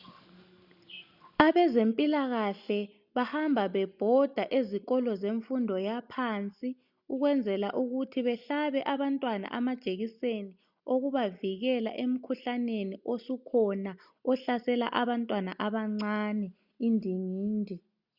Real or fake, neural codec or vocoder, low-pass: real; none; 5.4 kHz